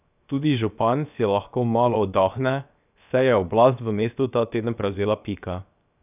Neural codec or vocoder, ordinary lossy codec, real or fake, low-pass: codec, 16 kHz, 0.7 kbps, FocalCodec; none; fake; 3.6 kHz